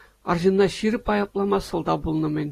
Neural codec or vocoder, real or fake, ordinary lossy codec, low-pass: none; real; AAC, 48 kbps; 14.4 kHz